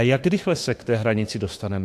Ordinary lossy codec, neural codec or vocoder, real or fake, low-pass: AAC, 64 kbps; autoencoder, 48 kHz, 32 numbers a frame, DAC-VAE, trained on Japanese speech; fake; 14.4 kHz